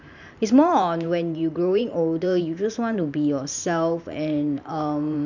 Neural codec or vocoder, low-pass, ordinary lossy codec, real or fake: vocoder, 44.1 kHz, 128 mel bands every 512 samples, BigVGAN v2; 7.2 kHz; none; fake